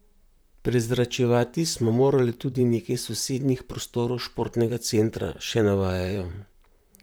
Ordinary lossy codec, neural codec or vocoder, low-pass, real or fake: none; vocoder, 44.1 kHz, 128 mel bands, Pupu-Vocoder; none; fake